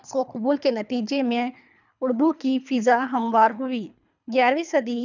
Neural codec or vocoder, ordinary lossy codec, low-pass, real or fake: codec, 24 kHz, 3 kbps, HILCodec; none; 7.2 kHz; fake